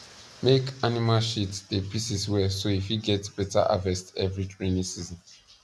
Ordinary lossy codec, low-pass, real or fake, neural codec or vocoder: none; none; real; none